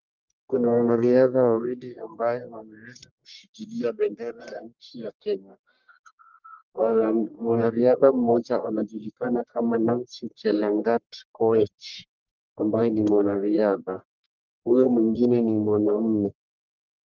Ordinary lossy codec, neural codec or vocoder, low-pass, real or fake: Opus, 32 kbps; codec, 44.1 kHz, 1.7 kbps, Pupu-Codec; 7.2 kHz; fake